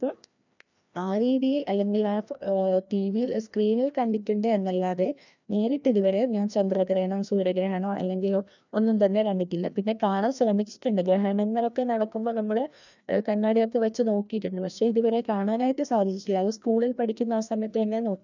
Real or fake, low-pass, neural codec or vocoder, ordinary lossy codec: fake; 7.2 kHz; codec, 16 kHz, 1 kbps, FreqCodec, larger model; none